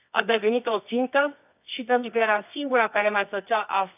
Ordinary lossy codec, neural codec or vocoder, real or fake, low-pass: none; codec, 24 kHz, 0.9 kbps, WavTokenizer, medium music audio release; fake; 3.6 kHz